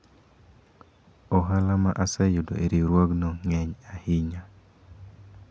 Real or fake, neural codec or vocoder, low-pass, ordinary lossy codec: real; none; none; none